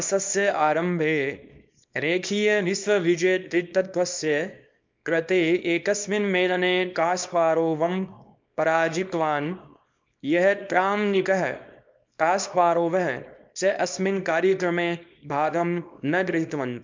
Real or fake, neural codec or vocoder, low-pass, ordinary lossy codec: fake; codec, 24 kHz, 0.9 kbps, WavTokenizer, small release; 7.2 kHz; MP3, 64 kbps